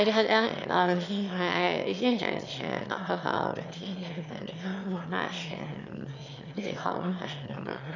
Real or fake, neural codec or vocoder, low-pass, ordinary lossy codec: fake; autoencoder, 22.05 kHz, a latent of 192 numbers a frame, VITS, trained on one speaker; 7.2 kHz; none